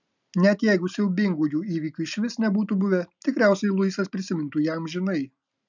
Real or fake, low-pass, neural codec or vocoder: real; 7.2 kHz; none